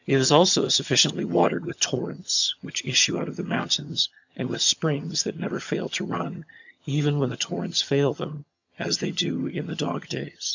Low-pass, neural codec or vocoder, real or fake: 7.2 kHz; vocoder, 22.05 kHz, 80 mel bands, HiFi-GAN; fake